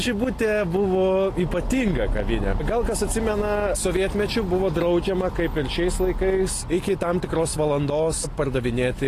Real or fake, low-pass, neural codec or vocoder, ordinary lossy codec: real; 14.4 kHz; none; AAC, 48 kbps